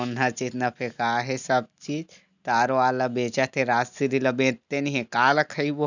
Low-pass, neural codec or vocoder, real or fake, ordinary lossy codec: 7.2 kHz; none; real; none